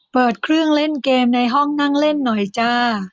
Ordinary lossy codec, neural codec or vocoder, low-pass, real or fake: none; none; none; real